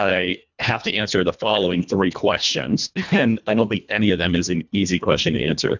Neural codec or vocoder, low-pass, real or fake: codec, 24 kHz, 1.5 kbps, HILCodec; 7.2 kHz; fake